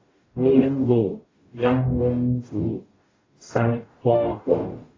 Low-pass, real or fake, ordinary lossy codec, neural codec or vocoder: 7.2 kHz; fake; AAC, 32 kbps; codec, 44.1 kHz, 0.9 kbps, DAC